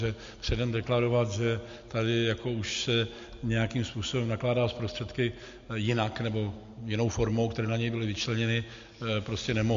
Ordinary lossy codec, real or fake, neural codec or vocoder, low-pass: MP3, 48 kbps; real; none; 7.2 kHz